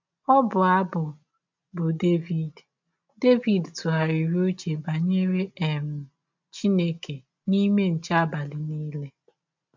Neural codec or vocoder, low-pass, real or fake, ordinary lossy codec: none; 7.2 kHz; real; MP3, 64 kbps